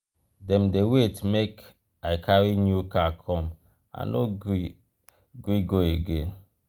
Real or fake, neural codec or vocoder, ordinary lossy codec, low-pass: real; none; Opus, 32 kbps; 19.8 kHz